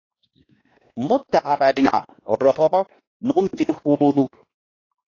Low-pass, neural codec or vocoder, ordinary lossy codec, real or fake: 7.2 kHz; codec, 16 kHz, 1 kbps, X-Codec, WavLM features, trained on Multilingual LibriSpeech; AAC, 32 kbps; fake